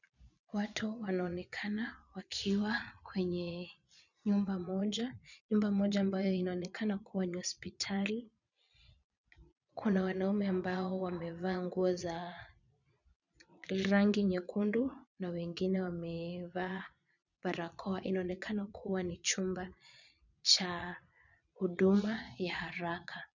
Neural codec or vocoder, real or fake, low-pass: vocoder, 22.05 kHz, 80 mel bands, Vocos; fake; 7.2 kHz